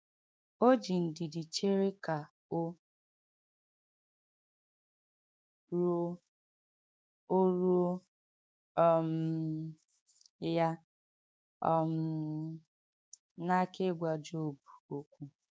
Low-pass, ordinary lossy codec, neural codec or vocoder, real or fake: none; none; codec, 16 kHz, 6 kbps, DAC; fake